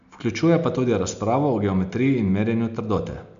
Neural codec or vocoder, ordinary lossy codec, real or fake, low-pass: none; none; real; 7.2 kHz